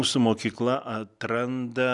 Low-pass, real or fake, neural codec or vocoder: 10.8 kHz; real; none